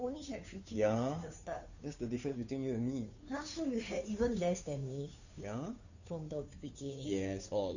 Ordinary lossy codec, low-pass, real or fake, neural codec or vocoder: none; 7.2 kHz; fake; codec, 16 kHz, 2 kbps, FunCodec, trained on Chinese and English, 25 frames a second